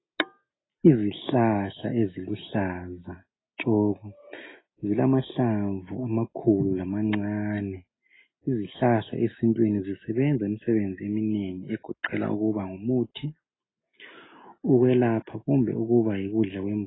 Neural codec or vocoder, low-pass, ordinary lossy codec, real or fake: none; 7.2 kHz; AAC, 16 kbps; real